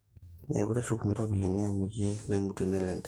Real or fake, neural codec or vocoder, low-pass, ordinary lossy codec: fake; codec, 44.1 kHz, 2.6 kbps, DAC; none; none